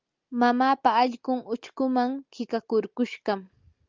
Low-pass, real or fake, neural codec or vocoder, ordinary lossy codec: 7.2 kHz; real; none; Opus, 32 kbps